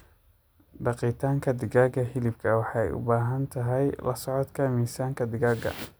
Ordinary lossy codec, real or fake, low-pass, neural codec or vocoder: none; real; none; none